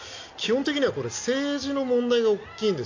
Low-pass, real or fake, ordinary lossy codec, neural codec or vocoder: 7.2 kHz; real; AAC, 48 kbps; none